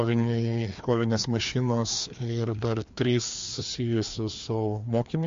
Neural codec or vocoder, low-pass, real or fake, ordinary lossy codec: codec, 16 kHz, 2 kbps, FreqCodec, larger model; 7.2 kHz; fake; MP3, 48 kbps